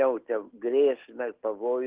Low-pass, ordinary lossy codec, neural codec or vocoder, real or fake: 3.6 kHz; Opus, 16 kbps; none; real